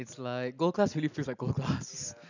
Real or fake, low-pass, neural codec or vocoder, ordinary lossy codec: real; 7.2 kHz; none; none